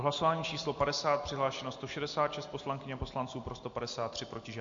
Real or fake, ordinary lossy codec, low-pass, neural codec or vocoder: real; MP3, 48 kbps; 7.2 kHz; none